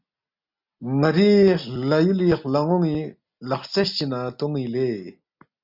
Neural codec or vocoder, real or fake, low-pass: none; real; 5.4 kHz